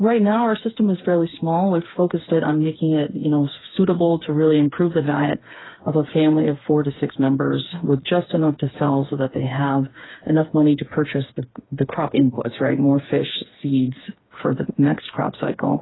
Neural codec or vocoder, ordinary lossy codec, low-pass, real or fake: codec, 16 kHz, 4 kbps, FreqCodec, smaller model; AAC, 16 kbps; 7.2 kHz; fake